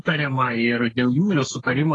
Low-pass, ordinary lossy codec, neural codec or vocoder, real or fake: 10.8 kHz; AAC, 32 kbps; codec, 32 kHz, 1.9 kbps, SNAC; fake